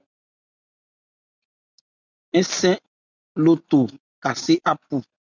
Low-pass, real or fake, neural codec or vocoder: 7.2 kHz; real; none